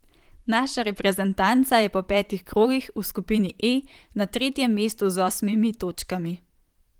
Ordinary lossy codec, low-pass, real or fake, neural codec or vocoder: Opus, 24 kbps; 19.8 kHz; fake; vocoder, 44.1 kHz, 128 mel bands, Pupu-Vocoder